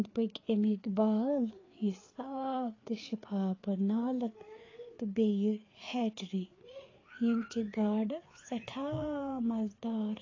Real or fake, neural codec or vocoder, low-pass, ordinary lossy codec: fake; codec, 16 kHz, 4 kbps, FreqCodec, larger model; 7.2 kHz; AAC, 32 kbps